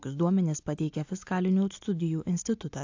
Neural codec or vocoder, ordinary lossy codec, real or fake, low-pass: none; AAC, 48 kbps; real; 7.2 kHz